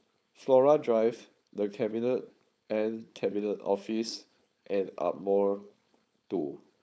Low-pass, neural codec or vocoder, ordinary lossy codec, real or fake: none; codec, 16 kHz, 4.8 kbps, FACodec; none; fake